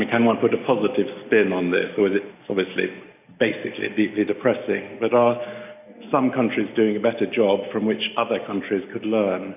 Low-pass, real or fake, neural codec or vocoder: 3.6 kHz; real; none